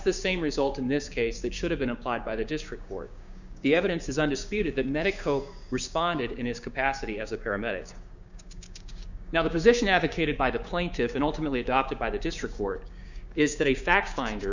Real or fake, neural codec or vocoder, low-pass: fake; codec, 16 kHz, 6 kbps, DAC; 7.2 kHz